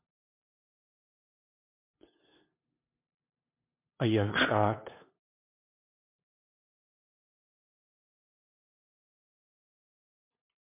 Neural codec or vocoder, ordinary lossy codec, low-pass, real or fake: codec, 16 kHz, 2 kbps, FunCodec, trained on LibriTTS, 25 frames a second; MP3, 32 kbps; 3.6 kHz; fake